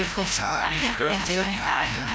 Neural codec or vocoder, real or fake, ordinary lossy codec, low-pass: codec, 16 kHz, 0.5 kbps, FreqCodec, larger model; fake; none; none